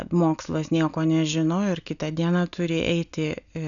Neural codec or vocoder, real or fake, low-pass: none; real; 7.2 kHz